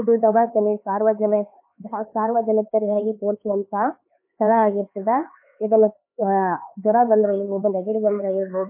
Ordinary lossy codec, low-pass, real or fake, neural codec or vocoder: MP3, 24 kbps; 3.6 kHz; fake; codec, 16 kHz, 4 kbps, X-Codec, HuBERT features, trained on LibriSpeech